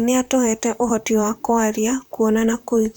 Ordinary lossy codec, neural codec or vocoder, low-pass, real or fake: none; vocoder, 44.1 kHz, 128 mel bands, Pupu-Vocoder; none; fake